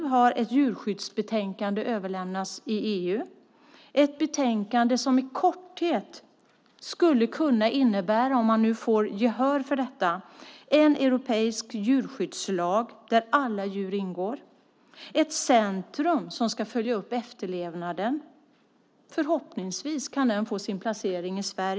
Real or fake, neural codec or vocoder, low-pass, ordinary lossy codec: real; none; none; none